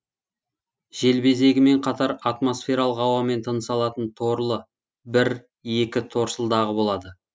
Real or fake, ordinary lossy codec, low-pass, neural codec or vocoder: real; none; none; none